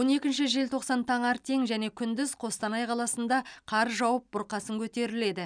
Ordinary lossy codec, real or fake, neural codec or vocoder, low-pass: none; real; none; 9.9 kHz